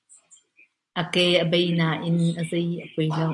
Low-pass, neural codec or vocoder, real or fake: 10.8 kHz; none; real